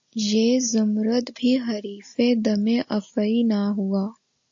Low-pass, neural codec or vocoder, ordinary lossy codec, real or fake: 7.2 kHz; none; AAC, 48 kbps; real